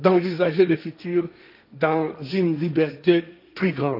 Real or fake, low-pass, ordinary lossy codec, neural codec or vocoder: fake; 5.4 kHz; none; codec, 16 kHz, 1.1 kbps, Voila-Tokenizer